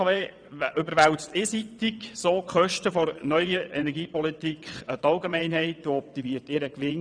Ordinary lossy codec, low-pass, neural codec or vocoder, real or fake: none; 9.9 kHz; vocoder, 22.05 kHz, 80 mel bands, WaveNeXt; fake